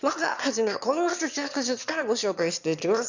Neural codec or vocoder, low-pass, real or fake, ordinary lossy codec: autoencoder, 22.05 kHz, a latent of 192 numbers a frame, VITS, trained on one speaker; 7.2 kHz; fake; none